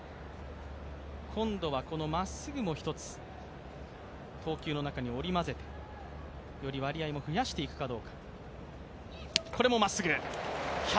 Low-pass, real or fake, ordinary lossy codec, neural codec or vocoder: none; real; none; none